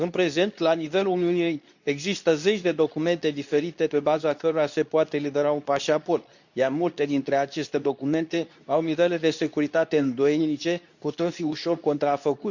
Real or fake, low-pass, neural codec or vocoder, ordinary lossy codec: fake; 7.2 kHz; codec, 24 kHz, 0.9 kbps, WavTokenizer, medium speech release version 2; none